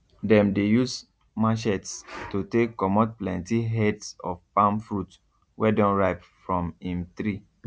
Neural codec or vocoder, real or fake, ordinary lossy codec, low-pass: none; real; none; none